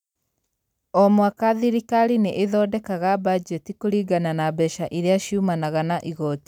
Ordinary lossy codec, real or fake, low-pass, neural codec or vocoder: none; real; 19.8 kHz; none